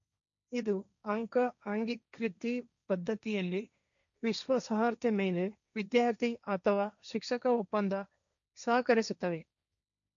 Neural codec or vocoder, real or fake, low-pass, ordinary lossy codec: codec, 16 kHz, 1.1 kbps, Voila-Tokenizer; fake; 7.2 kHz; none